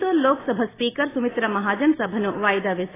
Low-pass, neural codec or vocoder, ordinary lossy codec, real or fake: 3.6 kHz; none; AAC, 16 kbps; real